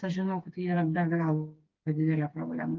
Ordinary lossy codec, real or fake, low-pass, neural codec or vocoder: Opus, 32 kbps; fake; 7.2 kHz; codec, 16 kHz, 4 kbps, FreqCodec, smaller model